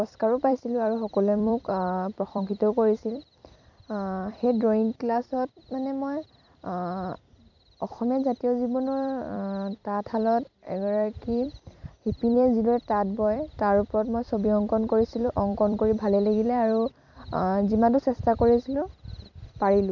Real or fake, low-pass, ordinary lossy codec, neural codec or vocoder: fake; 7.2 kHz; none; vocoder, 44.1 kHz, 128 mel bands every 256 samples, BigVGAN v2